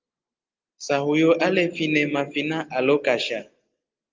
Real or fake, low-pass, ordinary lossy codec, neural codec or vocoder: real; 7.2 kHz; Opus, 24 kbps; none